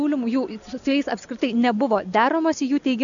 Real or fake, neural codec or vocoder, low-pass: real; none; 7.2 kHz